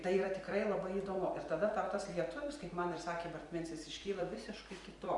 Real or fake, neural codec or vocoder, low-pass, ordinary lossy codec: fake; vocoder, 44.1 kHz, 128 mel bands every 256 samples, BigVGAN v2; 10.8 kHz; Opus, 64 kbps